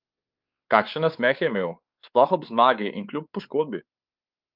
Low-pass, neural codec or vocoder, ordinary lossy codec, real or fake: 5.4 kHz; codec, 16 kHz, 4 kbps, X-Codec, WavLM features, trained on Multilingual LibriSpeech; Opus, 24 kbps; fake